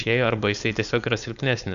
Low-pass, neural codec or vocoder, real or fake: 7.2 kHz; codec, 16 kHz, 4.8 kbps, FACodec; fake